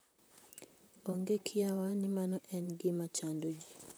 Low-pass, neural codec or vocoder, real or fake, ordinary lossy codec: none; vocoder, 44.1 kHz, 128 mel bands, Pupu-Vocoder; fake; none